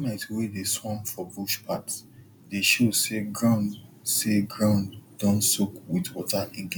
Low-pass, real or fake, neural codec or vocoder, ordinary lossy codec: 19.8 kHz; real; none; none